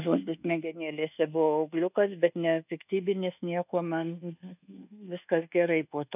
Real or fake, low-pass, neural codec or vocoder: fake; 3.6 kHz; codec, 24 kHz, 1.2 kbps, DualCodec